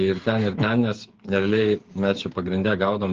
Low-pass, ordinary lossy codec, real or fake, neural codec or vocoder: 7.2 kHz; Opus, 16 kbps; fake; codec, 16 kHz, 8 kbps, FreqCodec, smaller model